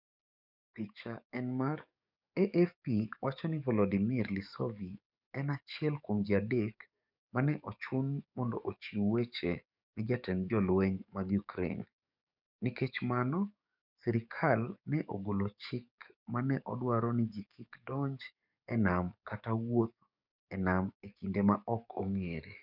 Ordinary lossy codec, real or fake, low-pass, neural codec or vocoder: none; fake; 5.4 kHz; codec, 44.1 kHz, 7.8 kbps, DAC